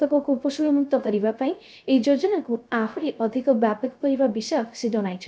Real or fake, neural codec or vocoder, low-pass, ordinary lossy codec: fake; codec, 16 kHz, 0.3 kbps, FocalCodec; none; none